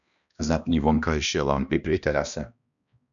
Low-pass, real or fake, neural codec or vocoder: 7.2 kHz; fake; codec, 16 kHz, 1 kbps, X-Codec, HuBERT features, trained on balanced general audio